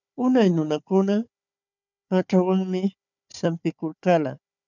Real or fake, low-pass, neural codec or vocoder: fake; 7.2 kHz; codec, 16 kHz, 4 kbps, FunCodec, trained on Chinese and English, 50 frames a second